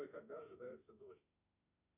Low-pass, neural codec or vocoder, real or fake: 3.6 kHz; autoencoder, 48 kHz, 32 numbers a frame, DAC-VAE, trained on Japanese speech; fake